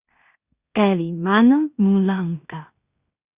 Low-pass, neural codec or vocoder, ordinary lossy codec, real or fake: 3.6 kHz; codec, 16 kHz in and 24 kHz out, 0.4 kbps, LongCat-Audio-Codec, two codebook decoder; Opus, 64 kbps; fake